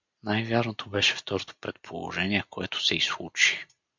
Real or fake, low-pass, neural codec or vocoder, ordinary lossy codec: real; 7.2 kHz; none; MP3, 64 kbps